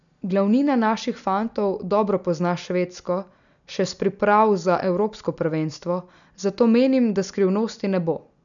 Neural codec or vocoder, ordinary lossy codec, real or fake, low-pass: none; none; real; 7.2 kHz